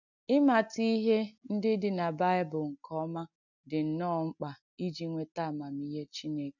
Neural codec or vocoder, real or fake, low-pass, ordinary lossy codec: none; real; 7.2 kHz; none